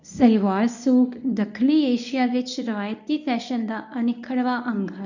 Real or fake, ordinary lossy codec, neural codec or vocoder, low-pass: fake; none; codec, 24 kHz, 0.9 kbps, WavTokenizer, medium speech release version 1; 7.2 kHz